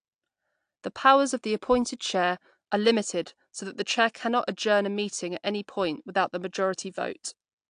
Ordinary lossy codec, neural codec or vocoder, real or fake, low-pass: AAC, 64 kbps; none; real; 9.9 kHz